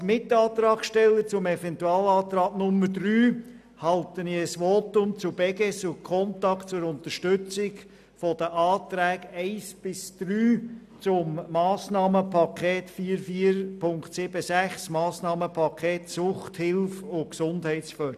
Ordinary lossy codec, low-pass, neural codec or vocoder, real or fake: none; 14.4 kHz; none; real